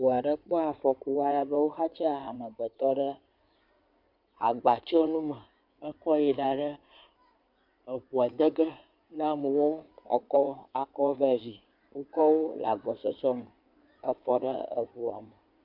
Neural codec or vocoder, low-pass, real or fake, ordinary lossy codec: codec, 16 kHz in and 24 kHz out, 2.2 kbps, FireRedTTS-2 codec; 5.4 kHz; fake; MP3, 48 kbps